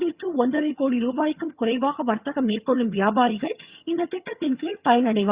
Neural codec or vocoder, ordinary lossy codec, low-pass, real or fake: vocoder, 22.05 kHz, 80 mel bands, HiFi-GAN; Opus, 64 kbps; 3.6 kHz; fake